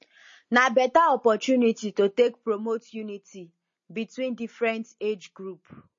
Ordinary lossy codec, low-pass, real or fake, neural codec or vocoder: MP3, 32 kbps; 7.2 kHz; real; none